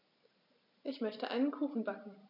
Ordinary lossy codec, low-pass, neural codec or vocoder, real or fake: none; 5.4 kHz; none; real